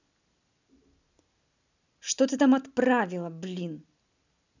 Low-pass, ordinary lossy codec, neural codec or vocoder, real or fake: 7.2 kHz; none; none; real